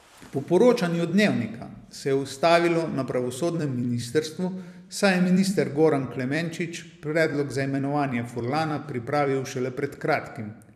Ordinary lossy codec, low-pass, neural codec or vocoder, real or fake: none; 14.4 kHz; vocoder, 44.1 kHz, 128 mel bands every 256 samples, BigVGAN v2; fake